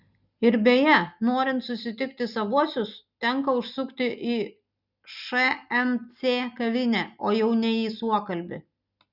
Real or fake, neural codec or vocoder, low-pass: real; none; 5.4 kHz